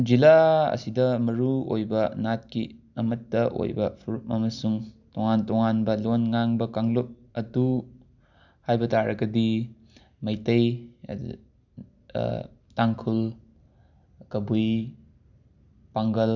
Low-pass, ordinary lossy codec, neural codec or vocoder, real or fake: 7.2 kHz; none; none; real